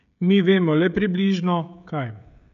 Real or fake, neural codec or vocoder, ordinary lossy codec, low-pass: fake; codec, 16 kHz, 16 kbps, FreqCodec, smaller model; none; 7.2 kHz